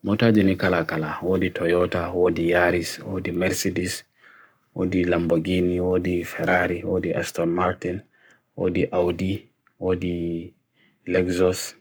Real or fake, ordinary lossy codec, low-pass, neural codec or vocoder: fake; none; none; codec, 44.1 kHz, 7.8 kbps, Pupu-Codec